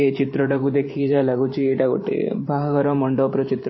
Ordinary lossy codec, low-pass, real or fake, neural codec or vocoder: MP3, 24 kbps; 7.2 kHz; fake; vocoder, 44.1 kHz, 128 mel bands every 512 samples, BigVGAN v2